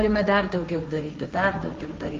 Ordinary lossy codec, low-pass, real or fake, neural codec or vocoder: Opus, 32 kbps; 7.2 kHz; fake; codec, 16 kHz, 1.1 kbps, Voila-Tokenizer